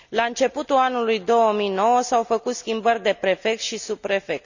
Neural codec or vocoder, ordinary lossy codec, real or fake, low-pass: none; none; real; 7.2 kHz